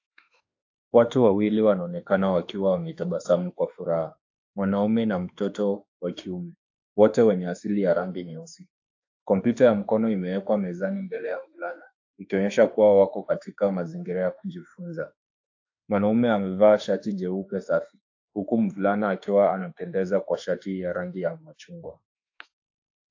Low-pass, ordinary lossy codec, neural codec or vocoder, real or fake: 7.2 kHz; AAC, 48 kbps; autoencoder, 48 kHz, 32 numbers a frame, DAC-VAE, trained on Japanese speech; fake